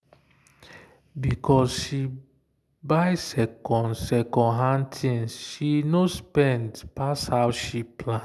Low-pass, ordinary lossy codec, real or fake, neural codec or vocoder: none; none; real; none